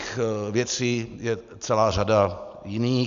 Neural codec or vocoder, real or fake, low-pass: none; real; 7.2 kHz